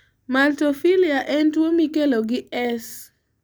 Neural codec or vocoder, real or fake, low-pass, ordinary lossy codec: none; real; none; none